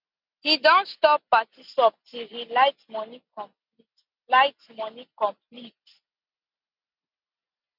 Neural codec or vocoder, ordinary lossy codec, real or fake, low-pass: none; none; real; 5.4 kHz